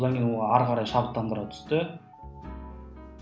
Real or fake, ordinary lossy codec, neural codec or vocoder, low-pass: real; none; none; none